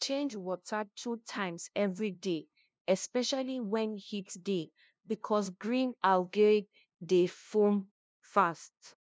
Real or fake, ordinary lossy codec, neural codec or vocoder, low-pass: fake; none; codec, 16 kHz, 0.5 kbps, FunCodec, trained on LibriTTS, 25 frames a second; none